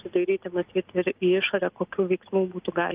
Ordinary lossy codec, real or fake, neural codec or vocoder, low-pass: Opus, 32 kbps; real; none; 3.6 kHz